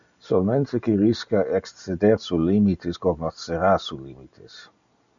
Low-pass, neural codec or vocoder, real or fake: 7.2 kHz; none; real